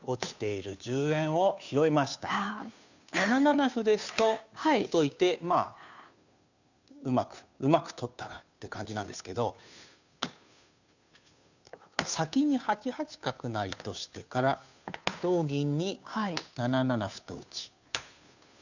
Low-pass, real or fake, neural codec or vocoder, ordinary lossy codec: 7.2 kHz; fake; codec, 16 kHz, 2 kbps, FunCodec, trained on Chinese and English, 25 frames a second; none